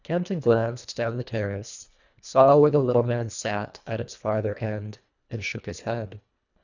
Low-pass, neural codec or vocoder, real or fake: 7.2 kHz; codec, 24 kHz, 1.5 kbps, HILCodec; fake